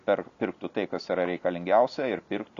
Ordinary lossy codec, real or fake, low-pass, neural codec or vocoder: Opus, 64 kbps; real; 7.2 kHz; none